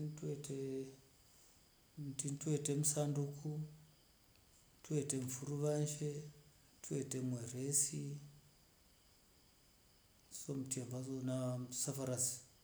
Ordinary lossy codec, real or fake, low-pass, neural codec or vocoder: none; real; none; none